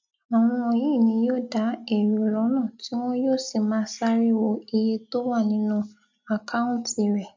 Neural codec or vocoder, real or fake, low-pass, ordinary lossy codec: none; real; 7.2 kHz; MP3, 64 kbps